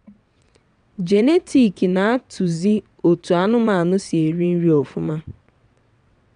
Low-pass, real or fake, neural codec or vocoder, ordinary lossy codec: 9.9 kHz; fake; vocoder, 22.05 kHz, 80 mel bands, WaveNeXt; none